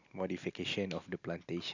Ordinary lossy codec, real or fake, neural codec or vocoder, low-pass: none; real; none; 7.2 kHz